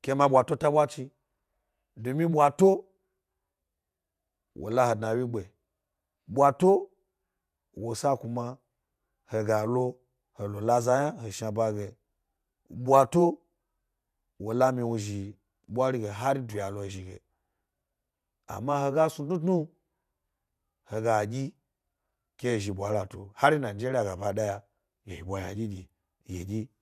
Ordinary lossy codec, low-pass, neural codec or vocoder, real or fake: none; 14.4 kHz; vocoder, 48 kHz, 128 mel bands, Vocos; fake